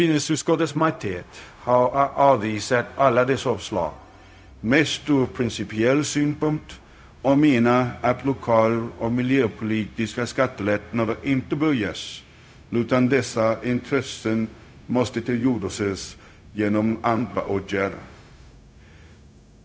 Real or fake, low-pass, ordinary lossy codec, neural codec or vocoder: fake; none; none; codec, 16 kHz, 0.4 kbps, LongCat-Audio-Codec